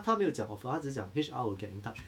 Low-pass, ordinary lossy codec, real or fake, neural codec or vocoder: 19.8 kHz; MP3, 96 kbps; fake; autoencoder, 48 kHz, 128 numbers a frame, DAC-VAE, trained on Japanese speech